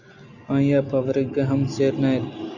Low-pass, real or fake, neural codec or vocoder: 7.2 kHz; real; none